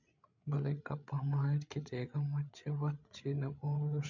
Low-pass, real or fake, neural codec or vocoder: 7.2 kHz; fake; codec, 16 kHz, 8 kbps, FreqCodec, larger model